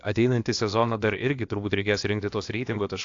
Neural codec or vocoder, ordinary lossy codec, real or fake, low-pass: codec, 16 kHz, about 1 kbps, DyCAST, with the encoder's durations; AAC, 48 kbps; fake; 7.2 kHz